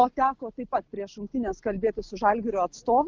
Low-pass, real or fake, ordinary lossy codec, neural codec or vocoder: 7.2 kHz; real; Opus, 32 kbps; none